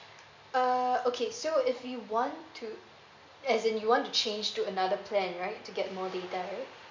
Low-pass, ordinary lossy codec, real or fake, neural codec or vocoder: 7.2 kHz; MP3, 48 kbps; real; none